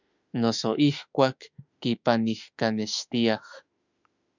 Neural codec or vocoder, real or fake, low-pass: autoencoder, 48 kHz, 32 numbers a frame, DAC-VAE, trained on Japanese speech; fake; 7.2 kHz